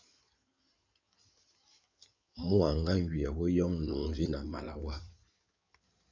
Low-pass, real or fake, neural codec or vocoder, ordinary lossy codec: 7.2 kHz; fake; codec, 16 kHz in and 24 kHz out, 2.2 kbps, FireRedTTS-2 codec; MP3, 64 kbps